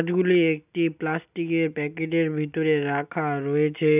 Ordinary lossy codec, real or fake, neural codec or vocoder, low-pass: none; real; none; 3.6 kHz